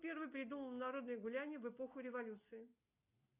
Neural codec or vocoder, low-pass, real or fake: none; 3.6 kHz; real